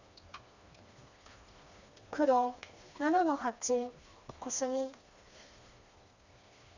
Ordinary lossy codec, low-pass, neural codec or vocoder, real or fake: none; 7.2 kHz; codec, 16 kHz, 2 kbps, FreqCodec, smaller model; fake